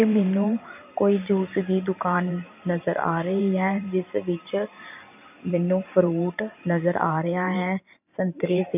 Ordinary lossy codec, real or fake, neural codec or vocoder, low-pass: none; fake; vocoder, 44.1 kHz, 128 mel bands every 512 samples, BigVGAN v2; 3.6 kHz